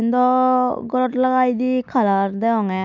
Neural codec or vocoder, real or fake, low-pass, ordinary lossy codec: none; real; 7.2 kHz; none